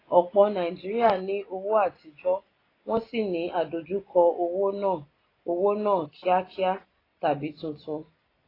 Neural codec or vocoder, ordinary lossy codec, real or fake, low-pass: none; AAC, 24 kbps; real; 5.4 kHz